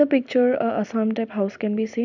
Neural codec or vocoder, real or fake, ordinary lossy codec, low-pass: vocoder, 44.1 kHz, 128 mel bands every 256 samples, BigVGAN v2; fake; none; 7.2 kHz